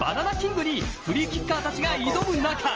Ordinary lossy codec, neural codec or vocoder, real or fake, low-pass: Opus, 24 kbps; none; real; 7.2 kHz